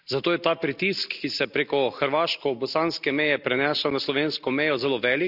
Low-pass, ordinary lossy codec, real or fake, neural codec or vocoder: 5.4 kHz; none; real; none